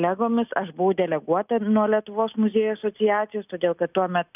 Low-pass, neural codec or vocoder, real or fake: 3.6 kHz; none; real